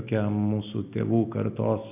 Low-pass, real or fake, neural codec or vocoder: 3.6 kHz; real; none